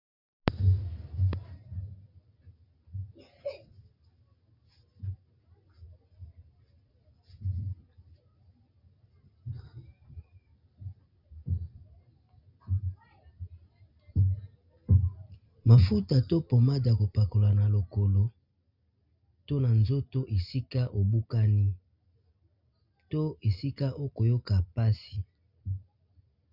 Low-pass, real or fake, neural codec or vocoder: 5.4 kHz; real; none